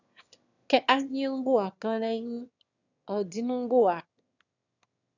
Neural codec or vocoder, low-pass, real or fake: autoencoder, 22.05 kHz, a latent of 192 numbers a frame, VITS, trained on one speaker; 7.2 kHz; fake